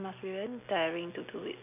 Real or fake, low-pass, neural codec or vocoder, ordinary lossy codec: real; 3.6 kHz; none; none